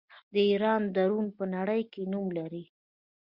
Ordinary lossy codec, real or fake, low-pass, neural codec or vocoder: Opus, 64 kbps; real; 5.4 kHz; none